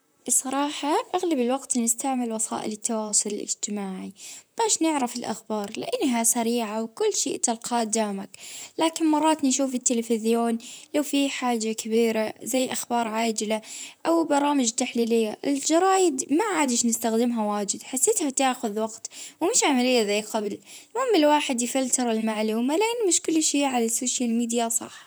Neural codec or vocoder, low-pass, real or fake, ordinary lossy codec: vocoder, 44.1 kHz, 128 mel bands, Pupu-Vocoder; none; fake; none